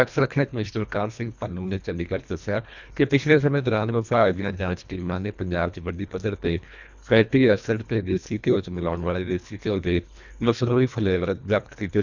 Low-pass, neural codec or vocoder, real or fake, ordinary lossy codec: 7.2 kHz; codec, 24 kHz, 1.5 kbps, HILCodec; fake; none